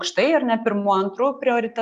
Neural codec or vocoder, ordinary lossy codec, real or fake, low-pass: none; Opus, 64 kbps; real; 9.9 kHz